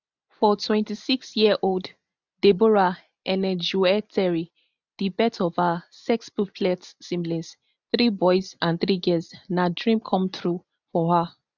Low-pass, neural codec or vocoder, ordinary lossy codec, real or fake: 7.2 kHz; none; none; real